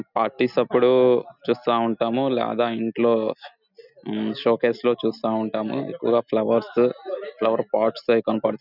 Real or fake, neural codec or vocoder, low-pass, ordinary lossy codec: real; none; 5.4 kHz; none